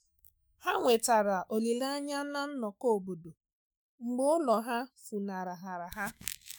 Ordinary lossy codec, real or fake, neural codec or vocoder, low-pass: none; fake; autoencoder, 48 kHz, 128 numbers a frame, DAC-VAE, trained on Japanese speech; none